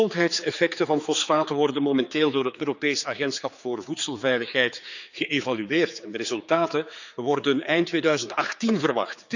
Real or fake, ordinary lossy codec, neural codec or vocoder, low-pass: fake; none; codec, 16 kHz, 4 kbps, X-Codec, HuBERT features, trained on general audio; 7.2 kHz